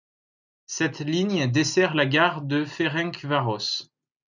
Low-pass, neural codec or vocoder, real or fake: 7.2 kHz; none; real